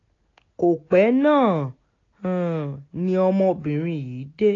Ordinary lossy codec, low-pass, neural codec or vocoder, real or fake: AAC, 32 kbps; 7.2 kHz; none; real